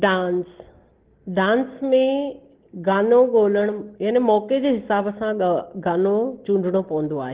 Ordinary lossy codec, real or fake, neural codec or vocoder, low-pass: Opus, 16 kbps; real; none; 3.6 kHz